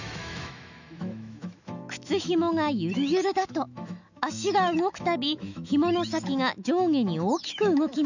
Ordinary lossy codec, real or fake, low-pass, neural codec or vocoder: none; fake; 7.2 kHz; autoencoder, 48 kHz, 128 numbers a frame, DAC-VAE, trained on Japanese speech